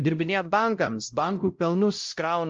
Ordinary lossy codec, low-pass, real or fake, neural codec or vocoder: Opus, 32 kbps; 7.2 kHz; fake; codec, 16 kHz, 0.5 kbps, X-Codec, WavLM features, trained on Multilingual LibriSpeech